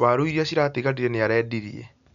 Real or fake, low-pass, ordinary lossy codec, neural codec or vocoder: real; 7.2 kHz; none; none